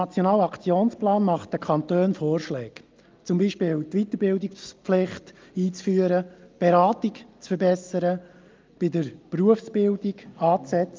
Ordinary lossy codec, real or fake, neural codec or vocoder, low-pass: Opus, 32 kbps; real; none; 7.2 kHz